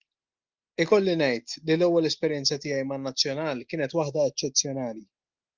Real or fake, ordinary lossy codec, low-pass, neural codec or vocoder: real; Opus, 32 kbps; 7.2 kHz; none